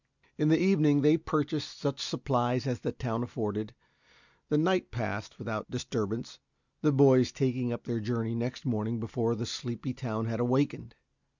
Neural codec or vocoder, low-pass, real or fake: none; 7.2 kHz; real